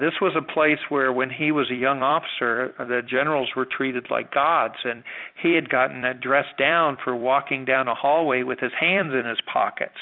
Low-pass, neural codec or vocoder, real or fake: 5.4 kHz; vocoder, 44.1 kHz, 128 mel bands every 256 samples, BigVGAN v2; fake